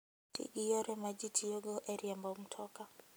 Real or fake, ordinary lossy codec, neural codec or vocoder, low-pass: real; none; none; none